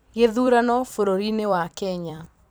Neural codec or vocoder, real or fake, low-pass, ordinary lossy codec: vocoder, 44.1 kHz, 128 mel bands every 256 samples, BigVGAN v2; fake; none; none